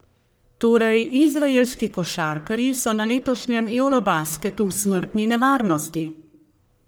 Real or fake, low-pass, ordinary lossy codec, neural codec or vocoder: fake; none; none; codec, 44.1 kHz, 1.7 kbps, Pupu-Codec